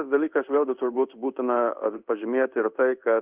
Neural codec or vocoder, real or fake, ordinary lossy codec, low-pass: codec, 16 kHz in and 24 kHz out, 1 kbps, XY-Tokenizer; fake; Opus, 24 kbps; 3.6 kHz